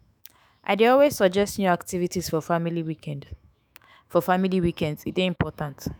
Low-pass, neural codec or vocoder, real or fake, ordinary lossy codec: none; autoencoder, 48 kHz, 128 numbers a frame, DAC-VAE, trained on Japanese speech; fake; none